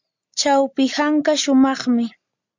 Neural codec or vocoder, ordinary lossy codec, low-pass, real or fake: none; MP3, 64 kbps; 7.2 kHz; real